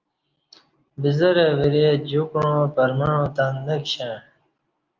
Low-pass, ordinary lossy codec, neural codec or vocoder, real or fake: 7.2 kHz; Opus, 24 kbps; none; real